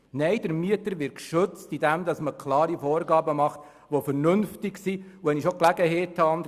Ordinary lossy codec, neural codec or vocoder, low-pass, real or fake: Opus, 64 kbps; none; 14.4 kHz; real